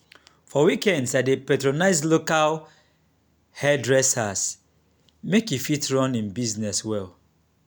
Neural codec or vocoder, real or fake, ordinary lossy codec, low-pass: none; real; none; none